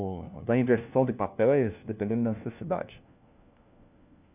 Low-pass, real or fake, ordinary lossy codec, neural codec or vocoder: 3.6 kHz; fake; none; codec, 16 kHz, 1 kbps, FunCodec, trained on LibriTTS, 50 frames a second